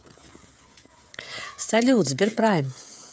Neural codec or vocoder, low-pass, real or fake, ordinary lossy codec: codec, 16 kHz, 16 kbps, FreqCodec, smaller model; none; fake; none